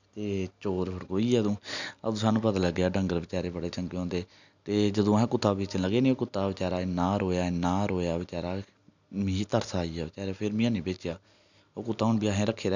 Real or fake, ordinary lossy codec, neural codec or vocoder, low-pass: real; none; none; 7.2 kHz